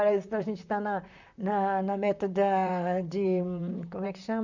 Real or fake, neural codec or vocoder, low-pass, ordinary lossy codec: fake; vocoder, 44.1 kHz, 128 mel bands, Pupu-Vocoder; 7.2 kHz; none